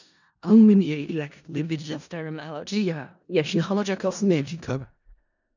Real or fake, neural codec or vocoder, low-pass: fake; codec, 16 kHz in and 24 kHz out, 0.4 kbps, LongCat-Audio-Codec, four codebook decoder; 7.2 kHz